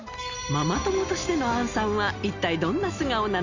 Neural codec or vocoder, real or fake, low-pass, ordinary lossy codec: none; real; 7.2 kHz; none